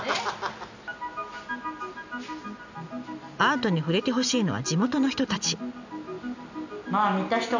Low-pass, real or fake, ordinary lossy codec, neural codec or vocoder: 7.2 kHz; real; none; none